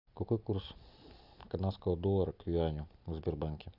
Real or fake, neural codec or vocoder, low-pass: real; none; 5.4 kHz